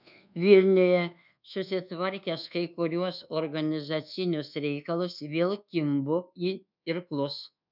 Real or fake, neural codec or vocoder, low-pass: fake; codec, 24 kHz, 1.2 kbps, DualCodec; 5.4 kHz